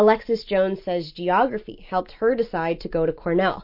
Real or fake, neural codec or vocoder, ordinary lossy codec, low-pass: real; none; MP3, 32 kbps; 5.4 kHz